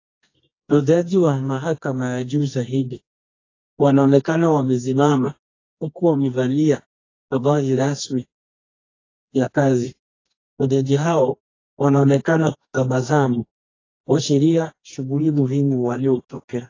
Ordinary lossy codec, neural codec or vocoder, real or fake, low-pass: AAC, 32 kbps; codec, 24 kHz, 0.9 kbps, WavTokenizer, medium music audio release; fake; 7.2 kHz